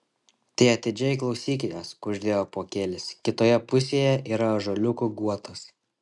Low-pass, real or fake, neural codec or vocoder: 10.8 kHz; real; none